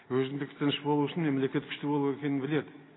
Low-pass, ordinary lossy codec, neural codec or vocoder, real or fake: 7.2 kHz; AAC, 16 kbps; none; real